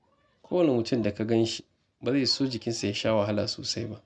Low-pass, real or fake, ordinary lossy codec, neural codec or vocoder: none; real; none; none